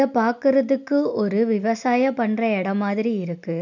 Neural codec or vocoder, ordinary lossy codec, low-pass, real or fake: none; none; 7.2 kHz; real